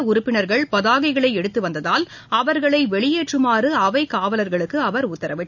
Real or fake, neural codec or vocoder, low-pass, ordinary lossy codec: real; none; 7.2 kHz; none